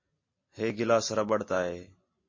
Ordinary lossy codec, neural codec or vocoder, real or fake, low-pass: MP3, 32 kbps; none; real; 7.2 kHz